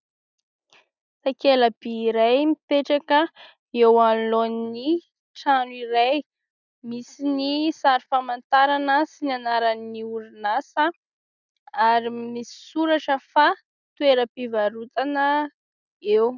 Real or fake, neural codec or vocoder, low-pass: real; none; 7.2 kHz